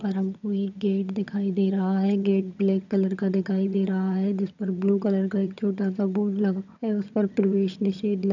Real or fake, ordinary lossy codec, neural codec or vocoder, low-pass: fake; none; vocoder, 22.05 kHz, 80 mel bands, HiFi-GAN; 7.2 kHz